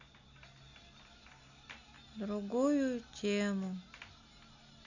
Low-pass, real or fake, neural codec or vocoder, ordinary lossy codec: 7.2 kHz; real; none; none